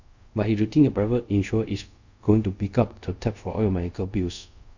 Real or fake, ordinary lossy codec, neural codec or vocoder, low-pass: fake; none; codec, 24 kHz, 0.5 kbps, DualCodec; 7.2 kHz